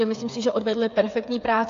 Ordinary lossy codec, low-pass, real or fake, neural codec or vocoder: AAC, 48 kbps; 7.2 kHz; fake; codec, 16 kHz, 8 kbps, FreqCodec, smaller model